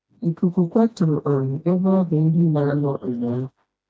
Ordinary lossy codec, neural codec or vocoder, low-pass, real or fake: none; codec, 16 kHz, 1 kbps, FreqCodec, smaller model; none; fake